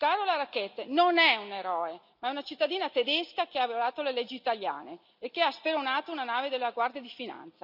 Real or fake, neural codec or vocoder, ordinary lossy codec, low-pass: real; none; none; 5.4 kHz